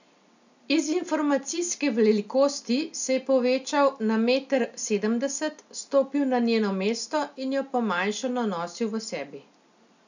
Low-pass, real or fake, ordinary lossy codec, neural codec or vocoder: 7.2 kHz; real; none; none